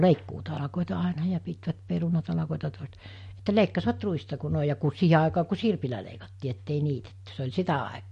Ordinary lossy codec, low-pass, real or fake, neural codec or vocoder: MP3, 48 kbps; 14.4 kHz; real; none